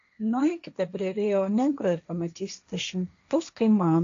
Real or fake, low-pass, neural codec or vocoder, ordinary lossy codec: fake; 7.2 kHz; codec, 16 kHz, 1.1 kbps, Voila-Tokenizer; MP3, 64 kbps